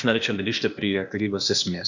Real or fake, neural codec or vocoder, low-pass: fake; codec, 16 kHz, 0.8 kbps, ZipCodec; 7.2 kHz